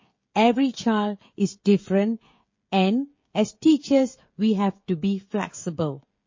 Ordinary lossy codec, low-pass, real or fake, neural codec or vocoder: MP3, 32 kbps; 7.2 kHz; fake; codec, 16 kHz, 16 kbps, FreqCodec, smaller model